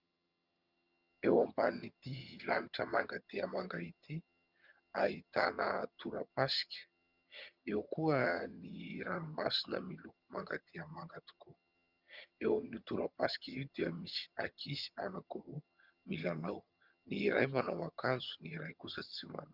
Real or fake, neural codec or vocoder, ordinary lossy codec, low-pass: fake; vocoder, 22.05 kHz, 80 mel bands, HiFi-GAN; Opus, 64 kbps; 5.4 kHz